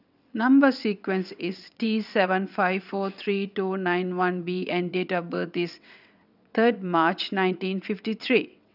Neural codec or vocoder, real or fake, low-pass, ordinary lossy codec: vocoder, 22.05 kHz, 80 mel bands, WaveNeXt; fake; 5.4 kHz; none